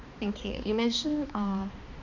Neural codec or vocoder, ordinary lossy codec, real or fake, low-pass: codec, 16 kHz, 2 kbps, X-Codec, HuBERT features, trained on balanced general audio; none; fake; 7.2 kHz